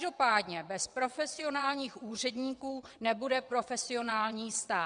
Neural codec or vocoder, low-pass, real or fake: vocoder, 22.05 kHz, 80 mel bands, WaveNeXt; 9.9 kHz; fake